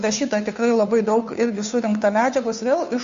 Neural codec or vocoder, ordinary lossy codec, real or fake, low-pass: codec, 16 kHz, 2 kbps, FunCodec, trained on Chinese and English, 25 frames a second; MP3, 48 kbps; fake; 7.2 kHz